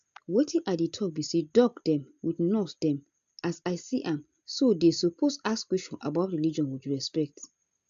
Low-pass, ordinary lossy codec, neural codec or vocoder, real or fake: 7.2 kHz; AAC, 64 kbps; none; real